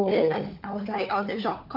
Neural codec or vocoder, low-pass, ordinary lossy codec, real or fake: codec, 16 kHz, 4 kbps, FunCodec, trained on LibriTTS, 50 frames a second; 5.4 kHz; AAC, 48 kbps; fake